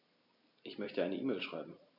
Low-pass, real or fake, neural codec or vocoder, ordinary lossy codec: 5.4 kHz; real; none; none